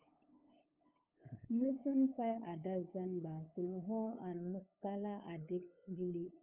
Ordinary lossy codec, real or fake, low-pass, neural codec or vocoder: Opus, 32 kbps; fake; 3.6 kHz; codec, 16 kHz, 8 kbps, FunCodec, trained on LibriTTS, 25 frames a second